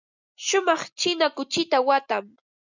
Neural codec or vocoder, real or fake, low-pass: none; real; 7.2 kHz